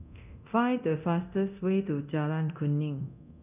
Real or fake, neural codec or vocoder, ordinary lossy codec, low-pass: fake; codec, 24 kHz, 0.9 kbps, DualCodec; none; 3.6 kHz